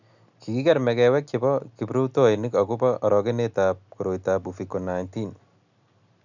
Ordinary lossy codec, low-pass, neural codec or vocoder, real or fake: none; 7.2 kHz; none; real